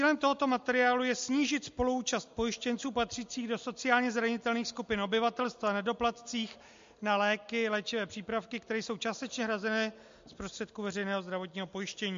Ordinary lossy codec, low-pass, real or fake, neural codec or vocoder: MP3, 48 kbps; 7.2 kHz; real; none